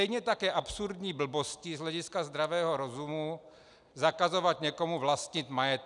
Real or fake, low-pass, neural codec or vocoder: real; 10.8 kHz; none